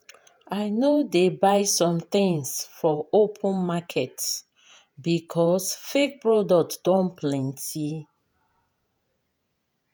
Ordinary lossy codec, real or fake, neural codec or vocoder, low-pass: none; fake; vocoder, 48 kHz, 128 mel bands, Vocos; none